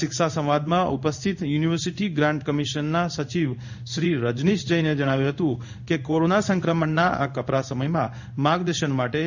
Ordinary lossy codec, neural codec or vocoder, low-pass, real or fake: none; codec, 16 kHz in and 24 kHz out, 1 kbps, XY-Tokenizer; 7.2 kHz; fake